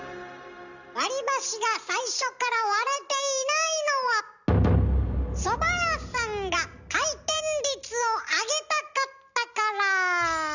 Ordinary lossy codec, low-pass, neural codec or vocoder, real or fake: none; 7.2 kHz; none; real